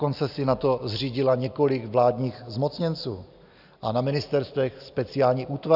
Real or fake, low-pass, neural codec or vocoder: real; 5.4 kHz; none